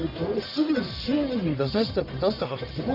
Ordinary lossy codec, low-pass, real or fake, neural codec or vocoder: none; 5.4 kHz; fake; codec, 44.1 kHz, 1.7 kbps, Pupu-Codec